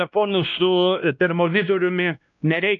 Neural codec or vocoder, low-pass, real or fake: codec, 16 kHz, 1 kbps, X-Codec, WavLM features, trained on Multilingual LibriSpeech; 7.2 kHz; fake